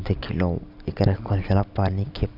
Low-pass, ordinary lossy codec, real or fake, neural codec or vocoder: 5.4 kHz; none; real; none